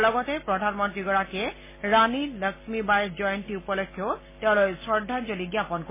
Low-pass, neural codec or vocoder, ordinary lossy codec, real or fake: 3.6 kHz; none; MP3, 16 kbps; real